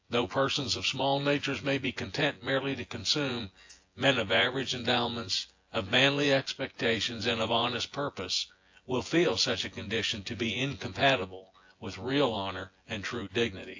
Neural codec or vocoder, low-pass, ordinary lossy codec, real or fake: vocoder, 24 kHz, 100 mel bands, Vocos; 7.2 kHz; AAC, 48 kbps; fake